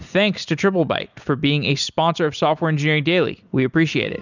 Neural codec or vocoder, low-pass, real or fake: none; 7.2 kHz; real